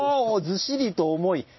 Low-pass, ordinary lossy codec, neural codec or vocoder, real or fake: 7.2 kHz; MP3, 24 kbps; none; real